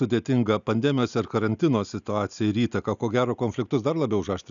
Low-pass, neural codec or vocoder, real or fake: 7.2 kHz; none; real